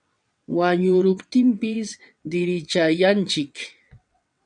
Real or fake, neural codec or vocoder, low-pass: fake; vocoder, 22.05 kHz, 80 mel bands, WaveNeXt; 9.9 kHz